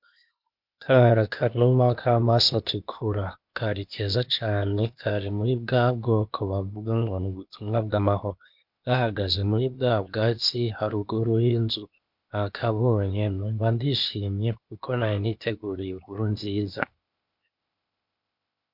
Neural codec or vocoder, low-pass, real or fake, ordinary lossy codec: codec, 16 kHz, 0.8 kbps, ZipCodec; 5.4 kHz; fake; MP3, 48 kbps